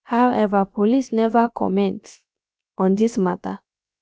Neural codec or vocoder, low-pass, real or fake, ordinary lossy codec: codec, 16 kHz, about 1 kbps, DyCAST, with the encoder's durations; none; fake; none